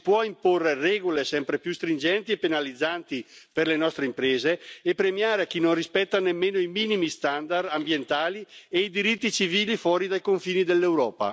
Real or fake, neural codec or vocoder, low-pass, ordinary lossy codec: real; none; none; none